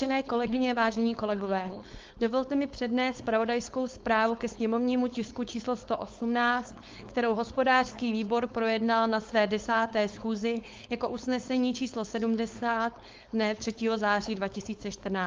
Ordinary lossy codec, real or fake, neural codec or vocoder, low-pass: Opus, 32 kbps; fake; codec, 16 kHz, 4.8 kbps, FACodec; 7.2 kHz